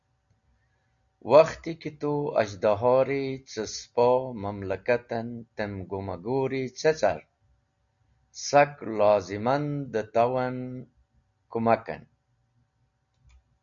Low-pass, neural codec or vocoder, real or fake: 7.2 kHz; none; real